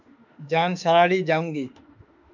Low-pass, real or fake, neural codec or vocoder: 7.2 kHz; fake; autoencoder, 48 kHz, 32 numbers a frame, DAC-VAE, trained on Japanese speech